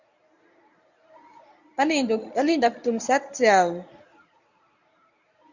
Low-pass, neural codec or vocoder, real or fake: 7.2 kHz; codec, 24 kHz, 0.9 kbps, WavTokenizer, medium speech release version 1; fake